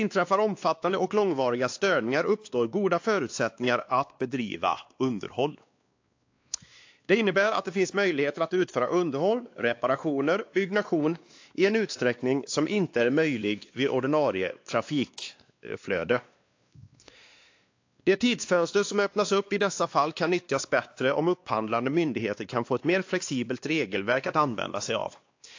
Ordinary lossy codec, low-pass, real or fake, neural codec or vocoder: AAC, 48 kbps; 7.2 kHz; fake; codec, 16 kHz, 2 kbps, X-Codec, WavLM features, trained on Multilingual LibriSpeech